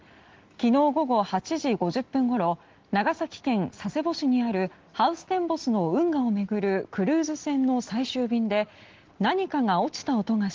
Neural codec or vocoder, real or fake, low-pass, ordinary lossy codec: none; real; 7.2 kHz; Opus, 16 kbps